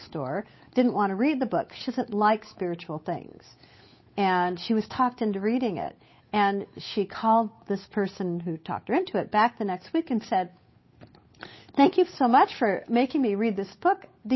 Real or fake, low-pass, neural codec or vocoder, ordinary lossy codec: fake; 7.2 kHz; codec, 16 kHz, 8 kbps, FunCodec, trained on Chinese and English, 25 frames a second; MP3, 24 kbps